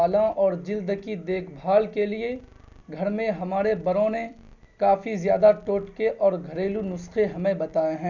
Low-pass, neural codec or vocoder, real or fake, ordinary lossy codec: 7.2 kHz; none; real; none